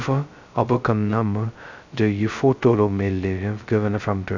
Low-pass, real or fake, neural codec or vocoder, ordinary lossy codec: 7.2 kHz; fake; codec, 16 kHz, 0.2 kbps, FocalCodec; Opus, 64 kbps